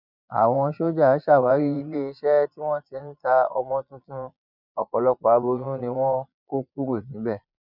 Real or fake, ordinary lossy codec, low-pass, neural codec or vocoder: fake; none; 5.4 kHz; vocoder, 22.05 kHz, 80 mel bands, Vocos